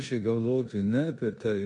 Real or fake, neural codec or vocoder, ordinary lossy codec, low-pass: fake; codec, 16 kHz in and 24 kHz out, 0.9 kbps, LongCat-Audio-Codec, four codebook decoder; MP3, 48 kbps; 10.8 kHz